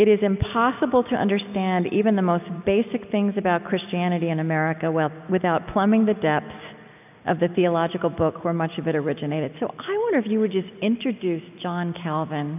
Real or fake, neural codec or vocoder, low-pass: real; none; 3.6 kHz